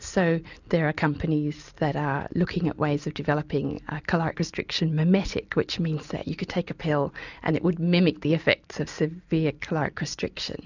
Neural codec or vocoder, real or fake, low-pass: none; real; 7.2 kHz